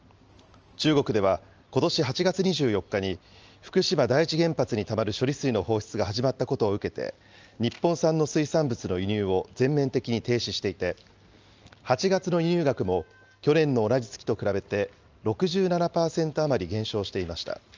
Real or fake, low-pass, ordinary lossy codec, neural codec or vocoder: real; 7.2 kHz; Opus, 24 kbps; none